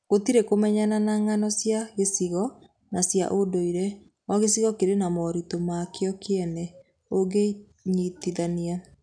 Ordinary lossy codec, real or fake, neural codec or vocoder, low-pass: none; real; none; 9.9 kHz